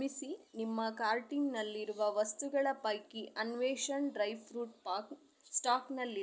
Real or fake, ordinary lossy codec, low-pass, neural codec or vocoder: real; none; none; none